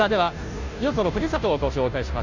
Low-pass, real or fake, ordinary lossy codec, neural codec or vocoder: 7.2 kHz; fake; none; codec, 16 kHz, 0.5 kbps, FunCodec, trained on Chinese and English, 25 frames a second